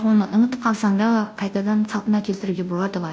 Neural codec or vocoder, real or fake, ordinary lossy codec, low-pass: codec, 16 kHz, 0.5 kbps, FunCodec, trained on Chinese and English, 25 frames a second; fake; none; none